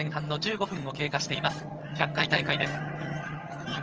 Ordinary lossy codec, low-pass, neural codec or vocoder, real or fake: Opus, 24 kbps; 7.2 kHz; vocoder, 22.05 kHz, 80 mel bands, HiFi-GAN; fake